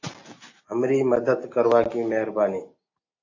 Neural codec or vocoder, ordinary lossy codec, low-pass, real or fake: none; AAC, 32 kbps; 7.2 kHz; real